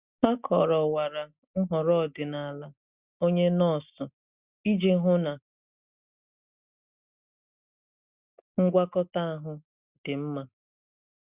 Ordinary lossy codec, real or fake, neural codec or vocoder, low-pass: Opus, 64 kbps; real; none; 3.6 kHz